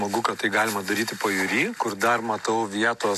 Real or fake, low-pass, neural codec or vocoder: real; 14.4 kHz; none